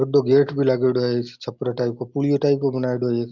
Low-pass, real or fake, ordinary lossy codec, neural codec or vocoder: 7.2 kHz; real; Opus, 32 kbps; none